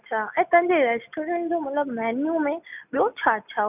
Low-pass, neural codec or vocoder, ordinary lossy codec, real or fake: 3.6 kHz; none; none; real